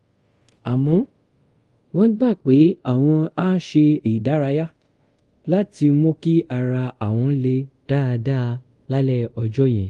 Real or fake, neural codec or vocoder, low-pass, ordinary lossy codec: fake; codec, 24 kHz, 0.5 kbps, DualCodec; 10.8 kHz; Opus, 24 kbps